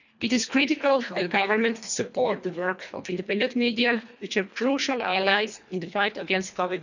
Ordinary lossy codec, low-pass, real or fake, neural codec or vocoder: none; 7.2 kHz; fake; codec, 24 kHz, 1.5 kbps, HILCodec